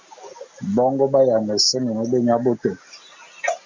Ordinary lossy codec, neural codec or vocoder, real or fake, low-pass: MP3, 64 kbps; none; real; 7.2 kHz